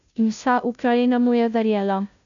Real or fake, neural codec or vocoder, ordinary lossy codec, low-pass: fake; codec, 16 kHz, 0.5 kbps, FunCodec, trained on Chinese and English, 25 frames a second; none; 7.2 kHz